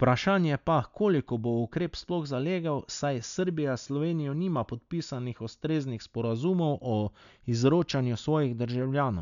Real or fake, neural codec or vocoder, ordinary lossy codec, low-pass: real; none; none; 7.2 kHz